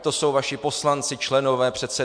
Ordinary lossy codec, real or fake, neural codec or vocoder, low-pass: MP3, 64 kbps; real; none; 9.9 kHz